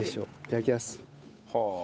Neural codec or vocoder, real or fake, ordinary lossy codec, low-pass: codec, 16 kHz, 8 kbps, FunCodec, trained on Chinese and English, 25 frames a second; fake; none; none